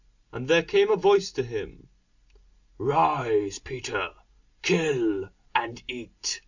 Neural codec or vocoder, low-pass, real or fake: none; 7.2 kHz; real